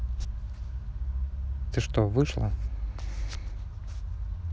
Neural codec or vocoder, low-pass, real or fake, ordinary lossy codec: none; none; real; none